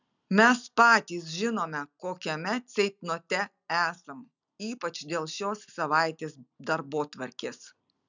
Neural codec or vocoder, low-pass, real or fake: none; 7.2 kHz; real